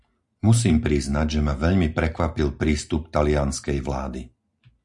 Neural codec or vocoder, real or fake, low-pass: none; real; 10.8 kHz